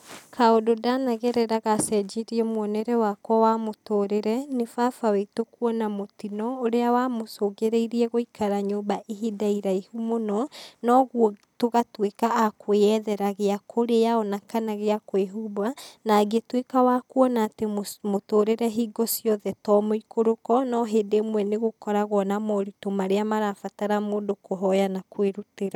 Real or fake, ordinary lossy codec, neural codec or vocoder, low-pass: real; none; none; 19.8 kHz